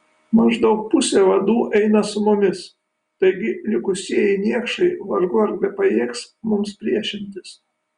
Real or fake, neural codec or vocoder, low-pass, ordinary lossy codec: real; none; 9.9 kHz; Opus, 64 kbps